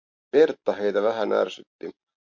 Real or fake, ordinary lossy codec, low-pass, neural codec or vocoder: real; MP3, 48 kbps; 7.2 kHz; none